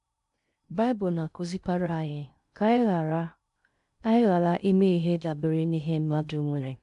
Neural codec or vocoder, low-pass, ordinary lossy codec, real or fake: codec, 16 kHz in and 24 kHz out, 0.6 kbps, FocalCodec, streaming, 2048 codes; 10.8 kHz; MP3, 64 kbps; fake